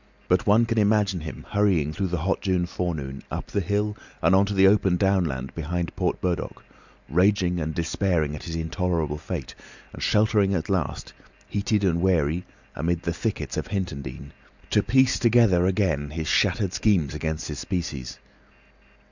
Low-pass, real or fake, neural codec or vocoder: 7.2 kHz; real; none